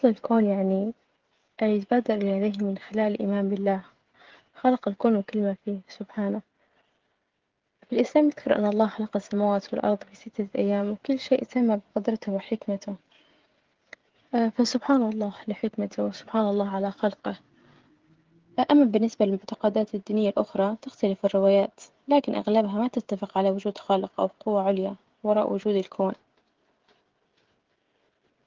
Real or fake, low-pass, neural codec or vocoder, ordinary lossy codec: fake; 7.2 kHz; codec, 16 kHz, 16 kbps, FreqCodec, smaller model; Opus, 16 kbps